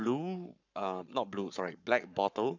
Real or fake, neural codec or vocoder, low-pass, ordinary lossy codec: fake; codec, 44.1 kHz, 7.8 kbps, Pupu-Codec; 7.2 kHz; none